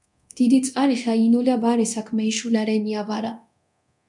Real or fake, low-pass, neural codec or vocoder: fake; 10.8 kHz; codec, 24 kHz, 0.9 kbps, DualCodec